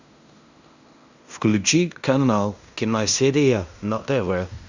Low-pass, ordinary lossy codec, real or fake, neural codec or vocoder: 7.2 kHz; Opus, 64 kbps; fake; codec, 16 kHz in and 24 kHz out, 0.9 kbps, LongCat-Audio-Codec, fine tuned four codebook decoder